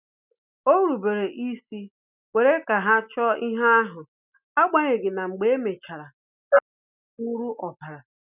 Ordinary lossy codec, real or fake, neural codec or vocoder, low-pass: none; real; none; 3.6 kHz